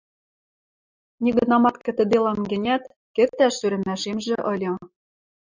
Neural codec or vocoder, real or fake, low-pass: none; real; 7.2 kHz